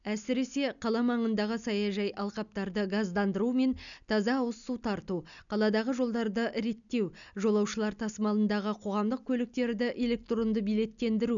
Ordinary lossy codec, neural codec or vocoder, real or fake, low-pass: none; none; real; 7.2 kHz